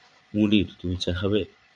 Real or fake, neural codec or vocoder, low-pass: fake; vocoder, 22.05 kHz, 80 mel bands, Vocos; 9.9 kHz